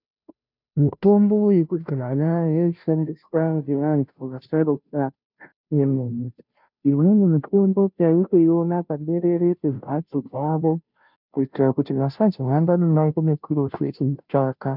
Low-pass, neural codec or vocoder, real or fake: 5.4 kHz; codec, 16 kHz, 0.5 kbps, FunCodec, trained on Chinese and English, 25 frames a second; fake